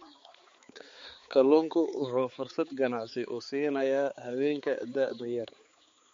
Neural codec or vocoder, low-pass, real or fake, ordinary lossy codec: codec, 16 kHz, 4 kbps, X-Codec, HuBERT features, trained on balanced general audio; 7.2 kHz; fake; MP3, 48 kbps